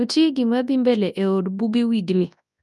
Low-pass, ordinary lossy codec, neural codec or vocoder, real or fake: none; none; codec, 24 kHz, 0.9 kbps, WavTokenizer, large speech release; fake